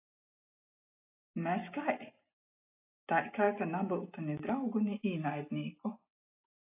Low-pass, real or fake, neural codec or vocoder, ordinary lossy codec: 3.6 kHz; real; none; AAC, 32 kbps